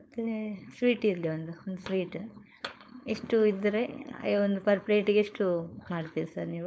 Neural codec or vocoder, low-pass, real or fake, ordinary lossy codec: codec, 16 kHz, 4.8 kbps, FACodec; none; fake; none